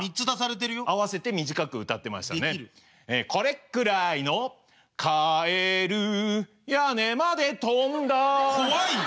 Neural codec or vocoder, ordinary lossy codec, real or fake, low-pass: none; none; real; none